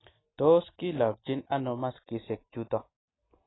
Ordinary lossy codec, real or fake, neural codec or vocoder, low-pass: AAC, 16 kbps; real; none; 7.2 kHz